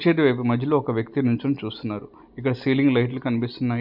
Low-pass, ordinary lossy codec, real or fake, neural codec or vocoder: 5.4 kHz; none; real; none